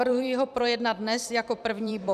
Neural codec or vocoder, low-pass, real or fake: vocoder, 44.1 kHz, 128 mel bands every 256 samples, BigVGAN v2; 14.4 kHz; fake